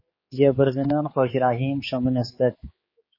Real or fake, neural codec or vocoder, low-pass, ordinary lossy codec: fake; codec, 16 kHz, 4 kbps, X-Codec, HuBERT features, trained on general audio; 5.4 kHz; MP3, 24 kbps